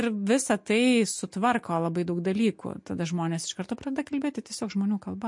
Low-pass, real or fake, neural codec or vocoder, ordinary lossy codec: 10.8 kHz; real; none; MP3, 48 kbps